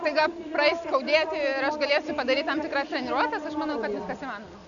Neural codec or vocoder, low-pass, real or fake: none; 7.2 kHz; real